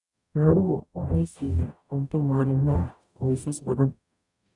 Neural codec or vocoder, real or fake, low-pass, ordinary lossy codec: codec, 44.1 kHz, 0.9 kbps, DAC; fake; 10.8 kHz; none